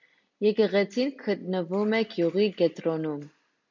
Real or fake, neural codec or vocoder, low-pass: real; none; 7.2 kHz